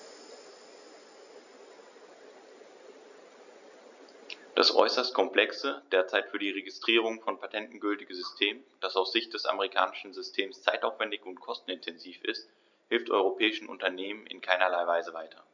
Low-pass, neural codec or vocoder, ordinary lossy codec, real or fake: 7.2 kHz; none; none; real